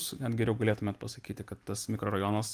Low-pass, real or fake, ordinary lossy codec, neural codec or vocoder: 14.4 kHz; fake; Opus, 32 kbps; vocoder, 44.1 kHz, 128 mel bands every 512 samples, BigVGAN v2